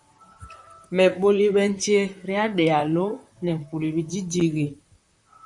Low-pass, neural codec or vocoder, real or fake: 10.8 kHz; vocoder, 44.1 kHz, 128 mel bands, Pupu-Vocoder; fake